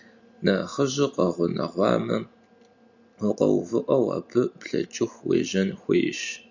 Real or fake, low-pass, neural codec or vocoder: real; 7.2 kHz; none